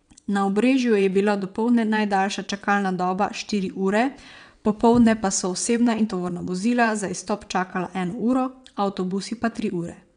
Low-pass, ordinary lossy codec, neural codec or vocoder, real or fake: 9.9 kHz; none; vocoder, 22.05 kHz, 80 mel bands, WaveNeXt; fake